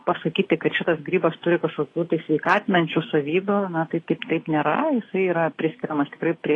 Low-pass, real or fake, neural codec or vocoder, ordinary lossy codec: 10.8 kHz; real; none; AAC, 32 kbps